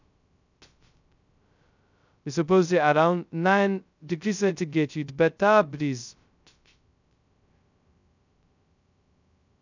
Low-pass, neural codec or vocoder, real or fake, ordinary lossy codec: 7.2 kHz; codec, 16 kHz, 0.2 kbps, FocalCodec; fake; none